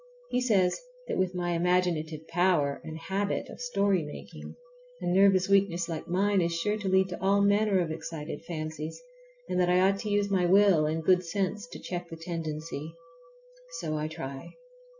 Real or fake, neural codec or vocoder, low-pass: real; none; 7.2 kHz